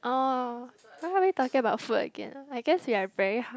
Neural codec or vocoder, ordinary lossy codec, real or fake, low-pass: none; none; real; none